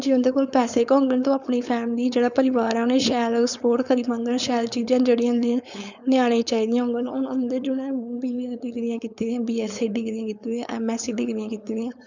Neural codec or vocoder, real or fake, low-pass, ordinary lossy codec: codec, 16 kHz, 4.8 kbps, FACodec; fake; 7.2 kHz; none